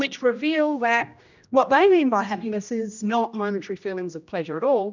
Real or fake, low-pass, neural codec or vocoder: fake; 7.2 kHz; codec, 16 kHz, 1 kbps, X-Codec, HuBERT features, trained on general audio